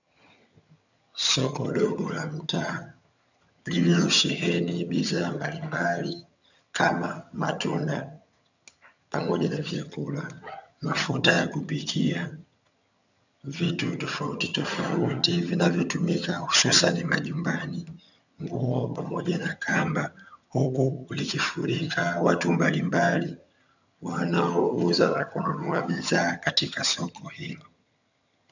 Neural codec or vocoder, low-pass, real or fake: vocoder, 22.05 kHz, 80 mel bands, HiFi-GAN; 7.2 kHz; fake